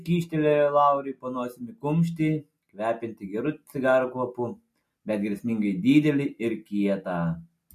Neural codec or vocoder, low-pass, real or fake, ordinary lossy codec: none; 14.4 kHz; real; MP3, 64 kbps